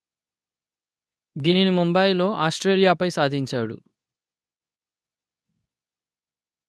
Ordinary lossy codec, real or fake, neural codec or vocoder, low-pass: none; fake; codec, 24 kHz, 0.9 kbps, WavTokenizer, medium speech release version 2; none